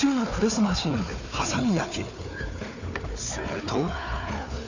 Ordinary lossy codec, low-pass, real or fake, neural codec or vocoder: none; 7.2 kHz; fake; codec, 16 kHz, 4 kbps, FunCodec, trained on Chinese and English, 50 frames a second